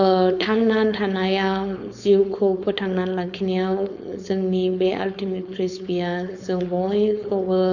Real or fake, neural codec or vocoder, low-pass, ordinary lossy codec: fake; codec, 16 kHz, 4.8 kbps, FACodec; 7.2 kHz; none